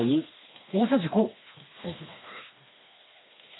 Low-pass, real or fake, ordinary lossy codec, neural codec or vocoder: 7.2 kHz; fake; AAC, 16 kbps; codec, 16 kHz, 2 kbps, FreqCodec, smaller model